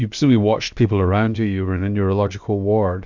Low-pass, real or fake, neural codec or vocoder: 7.2 kHz; fake; codec, 16 kHz, about 1 kbps, DyCAST, with the encoder's durations